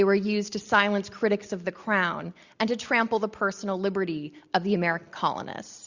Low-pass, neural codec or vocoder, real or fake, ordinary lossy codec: 7.2 kHz; none; real; Opus, 64 kbps